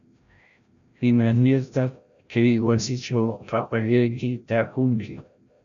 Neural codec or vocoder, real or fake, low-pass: codec, 16 kHz, 0.5 kbps, FreqCodec, larger model; fake; 7.2 kHz